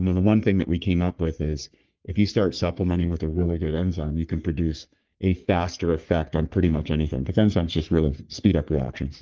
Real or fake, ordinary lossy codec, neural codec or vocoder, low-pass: fake; Opus, 32 kbps; codec, 44.1 kHz, 3.4 kbps, Pupu-Codec; 7.2 kHz